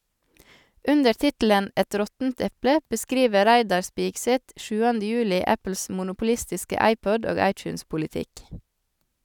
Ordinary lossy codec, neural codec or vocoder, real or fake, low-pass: none; none; real; 19.8 kHz